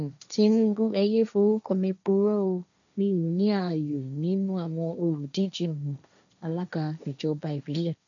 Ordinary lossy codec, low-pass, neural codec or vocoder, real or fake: none; 7.2 kHz; codec, 16 kHz, 1.1 kbps, Voila-Tokenizer; fake